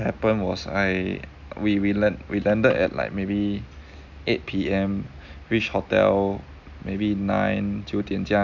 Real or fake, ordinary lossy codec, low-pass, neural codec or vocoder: real; none; 7.2 kHz; none